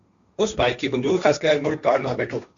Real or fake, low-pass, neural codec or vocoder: fake; 7.2 kHz; codec, 16 kHz, 1.1 kbps, Voila-Tokenizer